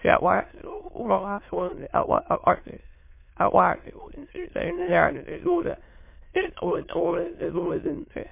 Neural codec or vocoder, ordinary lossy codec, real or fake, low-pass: autoencoder, 22.05 kHz, a latent of 192 numbers a frame, VITS, trained on many speakers; MP3, 24 kbps; fake; 3.6 kHz